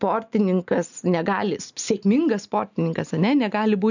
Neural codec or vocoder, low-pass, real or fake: none; 7.2 kHz; real